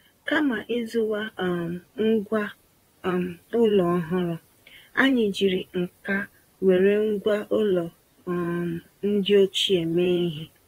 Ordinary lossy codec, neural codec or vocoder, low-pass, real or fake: AAC, 48 kbps; vocoder, 44.1 kHz, 128 mel bands, Pupu-Vocoder; 19.8 kHz; fake